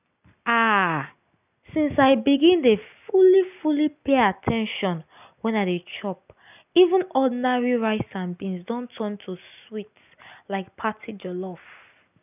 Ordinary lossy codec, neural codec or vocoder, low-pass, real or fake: none; none; 3.6 kHz; real